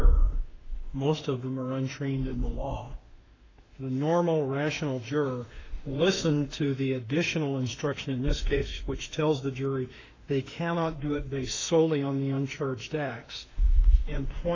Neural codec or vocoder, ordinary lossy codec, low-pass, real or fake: autoencoder, 48 kHz, 32 numbers a frame, DAC-VAE, trained on Japanese speech; AAC, 32 kbps; 7.2 kHz; fake